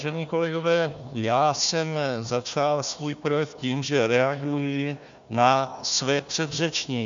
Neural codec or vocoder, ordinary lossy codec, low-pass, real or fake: codec, 16 kHz, 1 kbps, FunCodec, trained on Chinese and English, 50 frames a second; MP3, 64 kbps; 7.2 kHz; fake